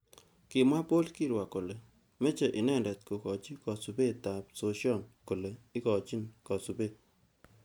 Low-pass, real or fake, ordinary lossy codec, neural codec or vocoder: none; real; none; none